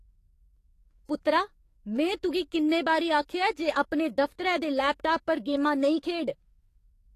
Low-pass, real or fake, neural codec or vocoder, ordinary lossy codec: 14.4 kHz; fake; codec, 44.1 kHz, 7.8 kbps, DAC; AAC, 48 kbps